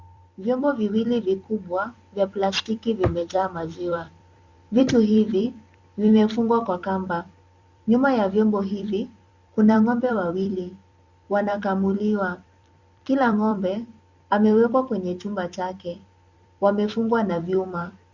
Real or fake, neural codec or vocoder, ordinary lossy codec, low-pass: fake; vocoder, 44.1 kHz, 128 mel bands every 256 samples, BigVGAN v2; Opus, 64 kbps; 7.2 kHz